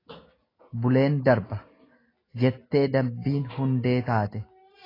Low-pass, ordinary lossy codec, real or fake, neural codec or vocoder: 5.4 kHz; AAC, 24 kbps; real; none